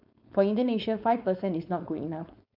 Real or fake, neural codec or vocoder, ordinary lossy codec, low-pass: fake; codec, 16 kHz, 4.8 kbps, FACodec; none; 5.4 kHz